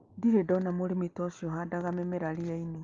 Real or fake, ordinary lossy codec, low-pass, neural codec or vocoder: real; Opus, 32 kbps; 7.2 kHz; none